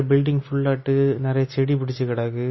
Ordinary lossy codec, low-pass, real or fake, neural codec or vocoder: MP3, 24 kbps; 7.2 kHz; real; none